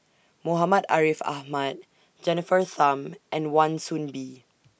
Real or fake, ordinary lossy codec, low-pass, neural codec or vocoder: real; none; none; none